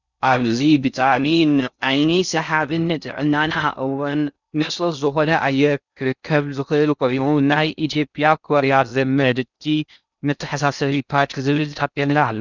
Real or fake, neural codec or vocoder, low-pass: fake; codec, 16 kHz in and 24 kHz out, 0.6 kbps, FocalCodec, streaming, 4096 codes; 7.2 kHz